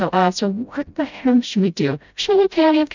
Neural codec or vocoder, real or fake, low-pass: codec, 16 kHz, 0.5 kbps, FreqCodec, smaller model; fake; 7.2 kHz